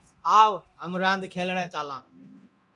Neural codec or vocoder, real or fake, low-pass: codec, 24 kHz, 0.9 kbps, DualCodec; fake; 10.8 kHz